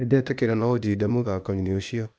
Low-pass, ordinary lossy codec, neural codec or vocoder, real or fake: none; none; codec, 16 kHz, 0.8 kbps, ZipCodec; fake